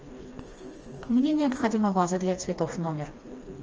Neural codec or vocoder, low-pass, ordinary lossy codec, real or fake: codec, 16 kHz, 2 kbps, FreqCodec, smaller model; 7.2 kHz; Opus, 24 kbps; fake